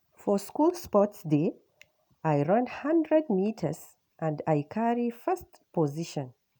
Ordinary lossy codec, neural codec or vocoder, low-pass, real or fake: none; none; none; real